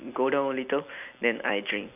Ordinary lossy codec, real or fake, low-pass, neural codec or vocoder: none; real; 3.6 kHz; none